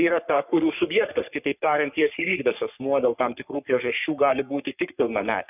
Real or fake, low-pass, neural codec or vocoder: fake; 3.6 kHz; codec, 44.1 kHz, 3.4 kbps, Pupu-Codec